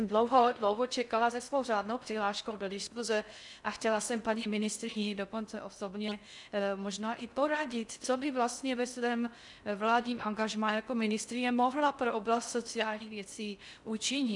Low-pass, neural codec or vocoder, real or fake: 10.8 kHz; codec, 16 kHz in and 24 kHz out, 0.6 kbps, FocalCodec, streaming, 4096 codes; fake